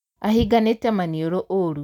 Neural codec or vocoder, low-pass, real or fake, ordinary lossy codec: none; 19.8 kHz; real; none